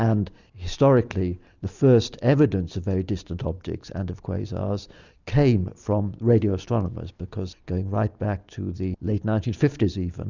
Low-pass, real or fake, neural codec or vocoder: 7.2 kHz; real; none